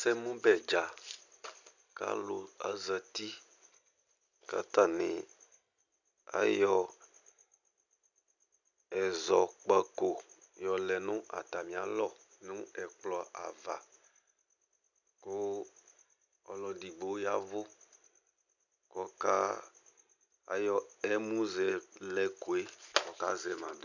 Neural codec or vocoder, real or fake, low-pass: none; real; 7.2 kHz